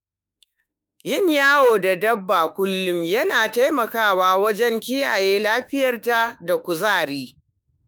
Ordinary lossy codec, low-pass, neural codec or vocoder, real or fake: none; none; autoencoder, 48 kHz, 32 numbers a frame, DAC-VAE, trained on Japanese speech; fake